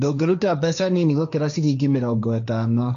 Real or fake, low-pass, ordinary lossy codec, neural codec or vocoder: fake; 7.2 kHz; none; codec, 16 kHz, 1.1 kbps, Voila-Tokenizer